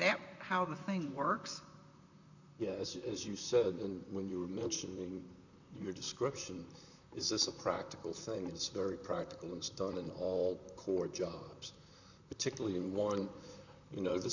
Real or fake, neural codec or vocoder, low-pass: fake; vocoder, 44.1 kHz, 128 mel bands, Pupu-Vocoder; 7.2 kHz